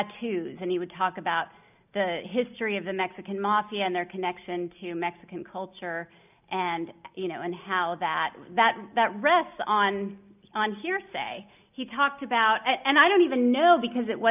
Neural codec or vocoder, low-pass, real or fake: none; 3.6 kHz; real